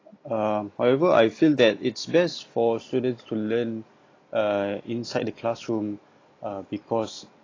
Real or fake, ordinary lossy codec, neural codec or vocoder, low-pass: real; AAC, 32 kbps; none; 7.2 kHz